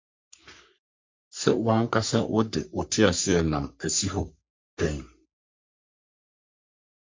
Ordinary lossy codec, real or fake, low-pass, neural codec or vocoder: MP3, 48 kbps; fake; 7.2 kHz; codec, 44.1 kHz, 3.4 kbps, Pupu-Codec